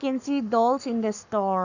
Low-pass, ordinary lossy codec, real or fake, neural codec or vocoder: 7.2 kHz; none; fake; codec, 44.1 kHz, 7.8 kbps, Pupu-Codec